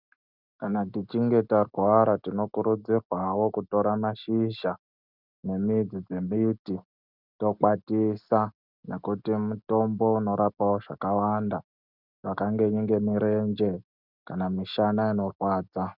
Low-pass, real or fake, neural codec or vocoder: 5.4 kHz; real; none